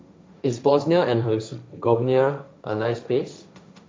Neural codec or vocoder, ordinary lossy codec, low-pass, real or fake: codec, 16 kHz, 1.1 kbps, Voila-Tokenizer; none; none; fake